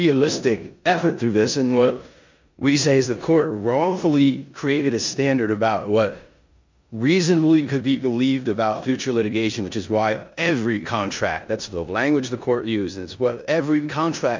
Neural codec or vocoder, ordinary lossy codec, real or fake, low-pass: codec, 16 kHz in and 24 kHz out, 0.9 kbps, LongCat-Audio-Codec, four codebook decoder; MP3, 64 kbps; fake; 7.2 kHz